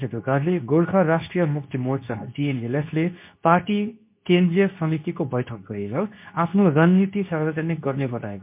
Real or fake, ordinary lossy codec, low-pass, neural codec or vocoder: fake; MP3, 32 kbps; 3.6 kHz; codec, 24 kHz, 0.9 kbps, WavTokenizer, medium speech release version 2